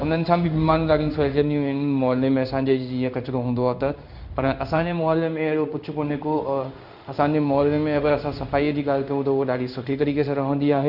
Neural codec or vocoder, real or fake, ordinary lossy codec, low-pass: codec, 16 kHz in and 24 kHz out, 1 kbps, XY-Tokenizer; fake; none; 5.4 kHz